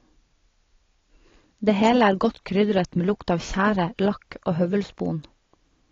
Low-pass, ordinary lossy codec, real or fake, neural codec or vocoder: 7.2 kHz; AAC, 32 kbps; real; none